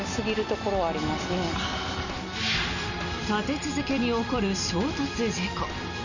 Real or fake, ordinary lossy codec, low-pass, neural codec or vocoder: real; none; 7.2 kHz; none